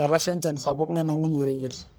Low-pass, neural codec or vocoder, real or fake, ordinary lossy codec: none; codec, 44.1 kHz, 1.7 kbps, Pupu-Codec; fake; none